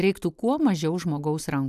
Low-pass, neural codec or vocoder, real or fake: 14.4 kHz; none; real